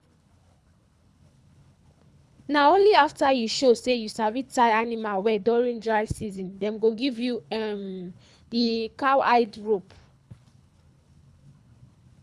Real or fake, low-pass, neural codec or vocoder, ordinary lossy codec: fake; none; codec, 24 kHz, 3 kbps, HILCodec; none